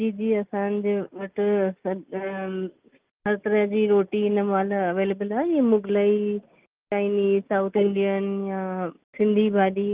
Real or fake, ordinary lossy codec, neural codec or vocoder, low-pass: real; Opus, 24 kbps; none; 3.6 kHz